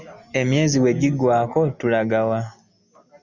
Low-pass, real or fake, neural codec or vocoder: 7.2 kHz; real; none